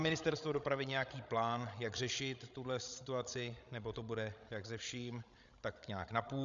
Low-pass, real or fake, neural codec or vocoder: 7.2 kHz; fake; codec, 16 kHz, 16 kbps, FreqCodec, larger model